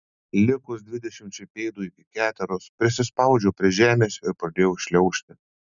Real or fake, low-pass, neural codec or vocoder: real; 7.2 kHz; none